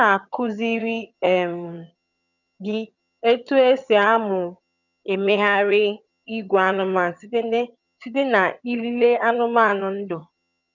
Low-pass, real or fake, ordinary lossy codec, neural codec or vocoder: 7.2 kHz; fake; none; vocoder, 22.05 kHz, 80 mel bands, HiFi-GAN